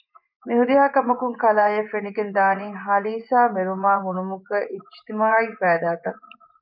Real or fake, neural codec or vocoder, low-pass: fake; vocoder, 24 kHz, 100 mel bands, Vocos; 5.4 kHz